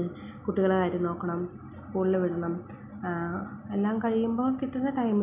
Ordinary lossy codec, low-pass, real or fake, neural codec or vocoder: none; 3.6 kHz; real; none